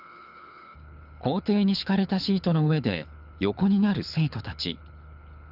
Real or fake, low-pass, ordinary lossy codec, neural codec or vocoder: fake; 5.4 kHz; none; codec, 24 kHz, 6 kbps, HILCodec